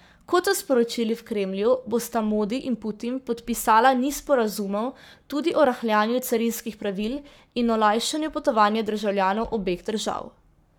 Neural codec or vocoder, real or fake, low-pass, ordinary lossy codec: codec, 44.1 kHz, 7.8 kbps, Pupu-Codec; fake; none; none